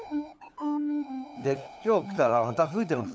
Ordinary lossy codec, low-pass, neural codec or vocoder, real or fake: none; none; codec, 16 kHz, 8 kbps, FunCodec, trained on LibriTTS, 25 frames a second; fake